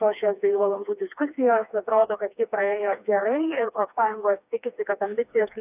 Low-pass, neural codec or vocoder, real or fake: 3.6 kHz; codec, 16 kHz, 2 kbps, FreqCodec, smaller model; fake